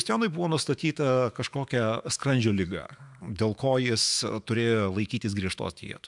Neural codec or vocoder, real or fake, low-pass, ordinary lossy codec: autoencoder, 48 kHz, 128 numbers a frame, DAC-VAE, trained on Japanese speech; fake; 10.8 kHz; MP3, 96 kbps